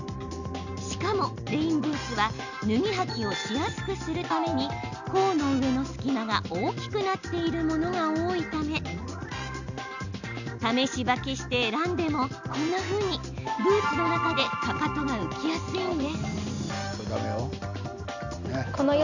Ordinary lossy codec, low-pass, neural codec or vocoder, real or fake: none; 7.2 kHz; none; real